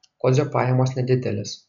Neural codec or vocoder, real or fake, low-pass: none; real; 7.2 kHz